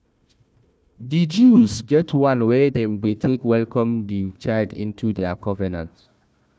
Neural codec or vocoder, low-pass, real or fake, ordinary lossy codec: codec, 16 kHz, 1 kbps, FunCodec, trained on Chinese and English, 50 frames a second; none; fake; none